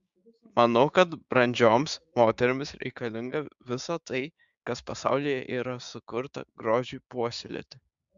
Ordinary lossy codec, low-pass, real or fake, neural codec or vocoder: Opus, 64 kbps; 7.2 kHz; fake; codec, 16 kHz, 6 kbps, DAC